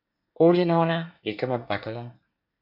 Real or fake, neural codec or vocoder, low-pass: fake; codec, 24 kHz, 1 kbps, SNAC; 5.4 kHz